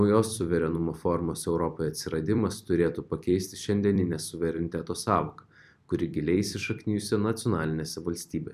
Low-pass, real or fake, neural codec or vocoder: 14.4 kHz; fake; vocoder, 44.1 kHz, 128 mel bands every 256 samples, BigVGAN v2